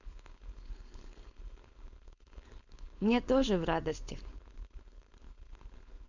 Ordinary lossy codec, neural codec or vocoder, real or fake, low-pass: none; codec, 16 kHz, 4.8 kbps, FACodec; fake; 7.2 kHz